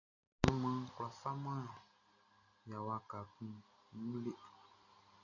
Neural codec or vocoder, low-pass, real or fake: none; 7.2 kHz; real